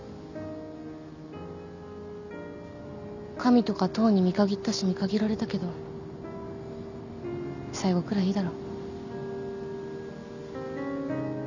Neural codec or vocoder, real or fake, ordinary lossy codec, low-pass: none; real; none; 7.2 kHz